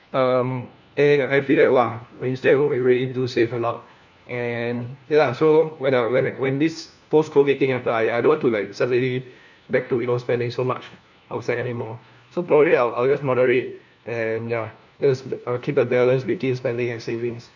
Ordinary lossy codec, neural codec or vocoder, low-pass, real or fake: none; codec, 16 kHz, 1 kbps, FunCodec, trained on LibriTTS, 50 frames a second; 7.2 kHz; fake